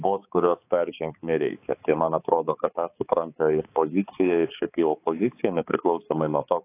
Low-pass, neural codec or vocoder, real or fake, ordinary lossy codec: 3.6 kHz; codec, 16 kHz, 4 kbps, X-Codec, HuBERT features, trained on balanced general audio; fake; AAC, 24 kbps